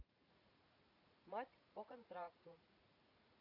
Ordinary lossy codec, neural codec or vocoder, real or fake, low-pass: none; vocoder, 44.1 kHz, 80 mel bands, Vocos; fake; 5.4 kHz